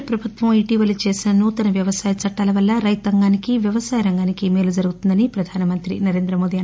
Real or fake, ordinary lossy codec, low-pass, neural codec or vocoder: real; none; none; none